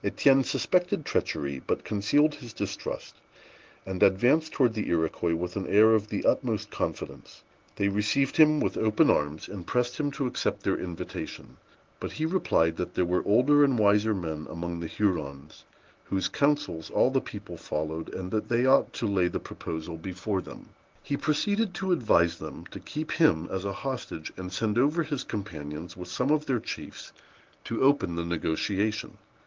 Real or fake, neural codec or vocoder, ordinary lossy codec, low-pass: real; none; Opus, 16 kbps; 7.2 kHz